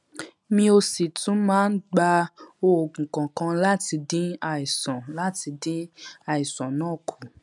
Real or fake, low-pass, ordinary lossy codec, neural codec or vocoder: real; 10.8 kHz; none; none